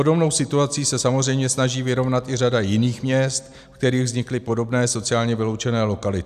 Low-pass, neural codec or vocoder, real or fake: 14.4 kHz; none; real